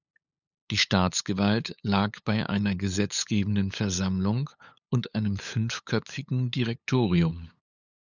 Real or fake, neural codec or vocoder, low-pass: fake; codec, 16 kHz, 8 kbps, FunCodec, trained on LibriTTS, 25 frames a second; 7.2 kHz